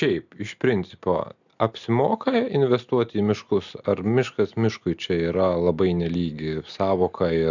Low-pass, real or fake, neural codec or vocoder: 7.2 kHz; real; none